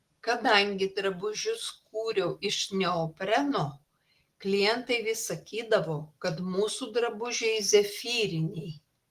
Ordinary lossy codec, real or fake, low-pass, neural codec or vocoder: Opus, 24 kbps; real; 14.4 kHz; none